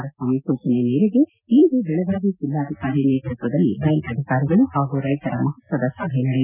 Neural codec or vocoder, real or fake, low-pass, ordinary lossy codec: none; real; 3.6 kHz; none